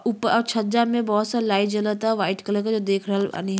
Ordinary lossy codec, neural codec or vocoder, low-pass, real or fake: none; none; none; real